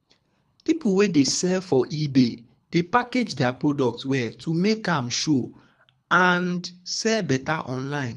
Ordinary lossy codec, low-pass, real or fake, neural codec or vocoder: none; none; fake; codec, 24 kHz, 3 kbps, HILCodec